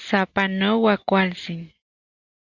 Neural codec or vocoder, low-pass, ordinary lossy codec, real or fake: none; 7.2 kHz; Opus, 64 kbps; real